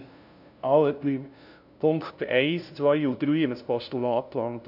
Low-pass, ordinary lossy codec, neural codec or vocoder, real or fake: 5.4 kHz; none; codec, 16 kHz, 0.5 kbps, FunCodec, trained on LibriTTS, 25 frames a second; fake